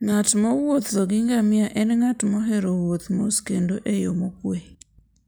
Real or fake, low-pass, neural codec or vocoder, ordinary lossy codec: real; none; none; none